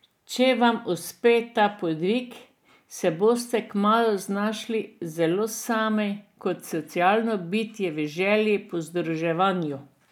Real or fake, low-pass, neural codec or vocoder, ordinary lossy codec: real; 19.8 kHz; none; none